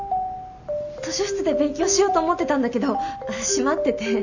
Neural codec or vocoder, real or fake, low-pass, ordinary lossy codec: none; real; 7.2 kHz; none